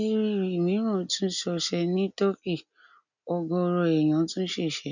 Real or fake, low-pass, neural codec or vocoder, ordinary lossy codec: real; 7.2 kHz; none; none